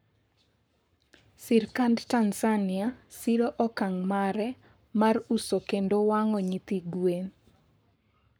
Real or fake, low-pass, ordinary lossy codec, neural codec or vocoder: fake; none; none; codec, 44.1 kHz, 7.8 kbps, Pupu-Codec